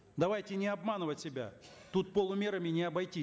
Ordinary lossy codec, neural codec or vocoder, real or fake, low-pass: none; none; real; none